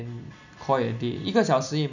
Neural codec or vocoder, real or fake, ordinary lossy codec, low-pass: none; real; none; 7.2 kHz